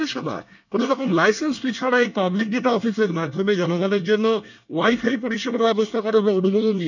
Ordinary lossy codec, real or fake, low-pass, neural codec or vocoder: none; fake; 7.2 kHz; codec, 24 kHz, 1 kbps, SNAC